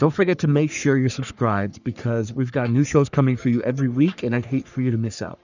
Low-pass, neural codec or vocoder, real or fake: 7.2 kHz; codec, 44.1 kHz, 3.4 kbps, Pupu-Codec; fake